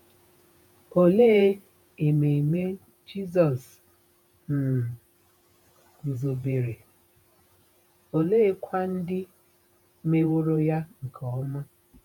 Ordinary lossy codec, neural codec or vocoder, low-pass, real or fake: none; vocoder, 48 kHz, 128 mel bands, Vocos; 19.8 kHz; fake